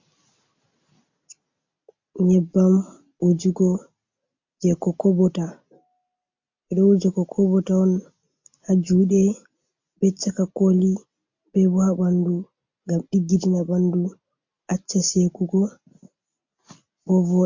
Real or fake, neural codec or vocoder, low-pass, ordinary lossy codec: real; none; 7.2 kHz; MP3, 48 kbps